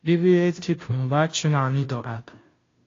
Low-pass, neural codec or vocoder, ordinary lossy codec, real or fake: 7.2 kHz; codec, 16 kHz, 0.5 kbps, FunCodec, trained on Chinese and English, 25 frames a second; AAC, 32 kbps; fake